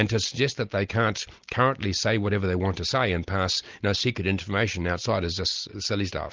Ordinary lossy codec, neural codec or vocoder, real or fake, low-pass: Opus, 24 kbps; none; real; 7.2 kHz